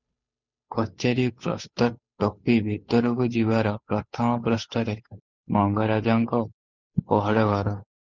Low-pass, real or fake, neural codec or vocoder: 7.2 kHz; fake; codec, 16 kHz, 8 kbps, FunCodec, trained on Chinese and English, 25 frames a second